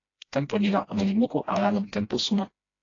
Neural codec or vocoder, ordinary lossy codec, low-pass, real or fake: codec, 16 kHz, 1 kbps, FreqCodec, smaller model; AAC, 32 kbps; 7.2 kHz; fake